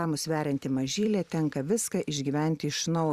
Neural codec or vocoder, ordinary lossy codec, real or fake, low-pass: vocoder, 44.1 kHz, 128 mel bands every 256 samples, BigVGAN v2; Opus, 64 kbps; fake; 14.4 kHz